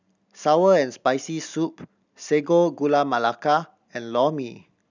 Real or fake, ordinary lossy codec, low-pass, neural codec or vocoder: real; none; 7.2 kHz; none